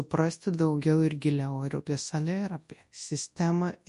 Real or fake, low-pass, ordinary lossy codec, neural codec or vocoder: fake; 10.8 kHz; MP3, 48 kbps; codec, 24 kHz, 0.9 kbps, WavTokenizer, large speech release